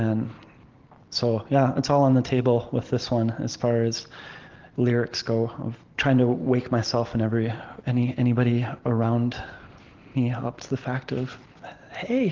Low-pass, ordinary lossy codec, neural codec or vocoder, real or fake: 7.2 kHz; Opus, 16 kbps; none; real